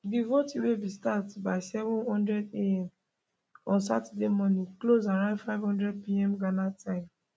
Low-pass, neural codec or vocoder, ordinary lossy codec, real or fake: none; none; none; real